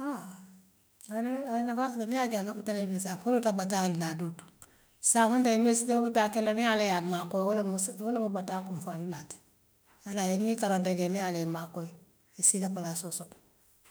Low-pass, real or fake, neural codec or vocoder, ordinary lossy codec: none; fake; autoencoder, 48 kHz, 32 numbers a frame, DAC-VAE, trained on Japanese speech; none